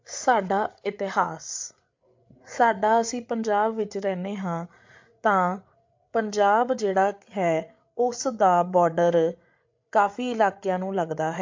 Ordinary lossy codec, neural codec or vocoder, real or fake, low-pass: MP3, 48 kbps; codec, 16 kHz, 8 kbps, FreqCodec, larger model; fake; 7.2 kHz